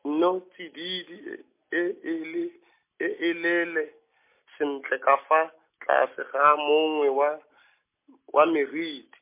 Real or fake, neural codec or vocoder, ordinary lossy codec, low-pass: real; none; MP3, 24 kbps; 3.6 kHz